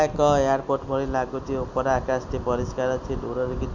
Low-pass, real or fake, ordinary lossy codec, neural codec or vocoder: 7.2 kHz; real; none; none